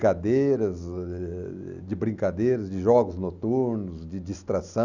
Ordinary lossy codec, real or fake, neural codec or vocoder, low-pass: none; real; none; 7.2 kHz